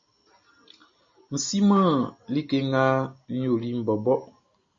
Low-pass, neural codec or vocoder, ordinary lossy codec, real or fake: 7.2 kHz; none; MP3, 32 kbps; real